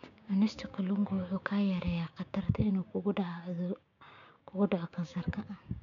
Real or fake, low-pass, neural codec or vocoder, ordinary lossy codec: fake; 7.2 kHz; codec, 16 kHz, 6 kbps, DAC; none